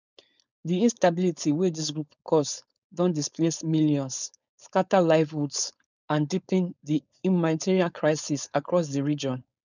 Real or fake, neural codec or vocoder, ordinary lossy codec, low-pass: fake; codec, 16 kHz, 4.8 kbps, FACodec; none; 7.2 kHz